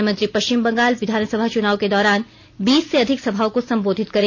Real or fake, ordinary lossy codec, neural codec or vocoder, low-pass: real; none; none; 7.2 kHz